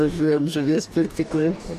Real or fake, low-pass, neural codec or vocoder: fake; 14.4 kHz; codec, 44.1 kHz, 2.6 kbps, DAC